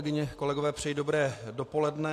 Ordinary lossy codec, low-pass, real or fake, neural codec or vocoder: AAC, 64 kbps; 14.4 kHz; real; none